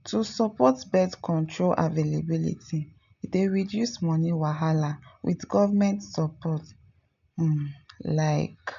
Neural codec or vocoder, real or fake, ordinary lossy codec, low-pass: none; real; none; 7.2 kHz